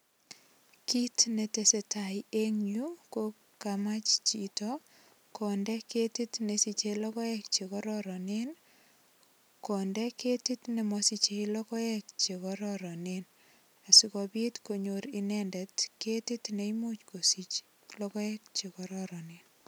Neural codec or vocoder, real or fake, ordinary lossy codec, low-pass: none; real; none; none